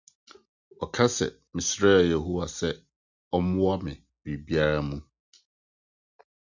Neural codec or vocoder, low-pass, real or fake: none; 7.2 kHz; real